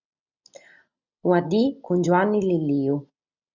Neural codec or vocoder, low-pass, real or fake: none; 7.2 kHz; real